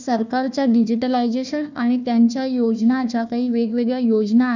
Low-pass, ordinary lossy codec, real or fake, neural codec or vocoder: 7.2 kHz; none; fake; codec, 16 kHz, 1 kbps, FunCodec, trained on Chinese and English, 50 frames a second